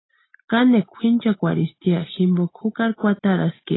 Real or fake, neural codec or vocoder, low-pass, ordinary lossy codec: real; none; 7.2 kHz; AAC, 16 kbps